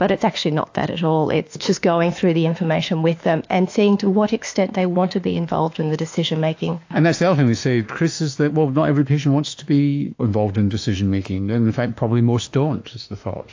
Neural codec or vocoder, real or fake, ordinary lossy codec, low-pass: autoencoder, 48 kHz, 32 numbers a frame, DAC-VAE, trained on Japanese speech; fake; AAC, 48 kbps; 7.2 kHz